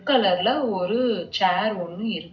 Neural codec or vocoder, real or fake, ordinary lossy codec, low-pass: none; real; none; 7.2 kHz